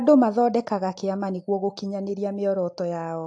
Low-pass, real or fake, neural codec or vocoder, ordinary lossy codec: 9.9 kHz; real; none; AAC, 48 kbps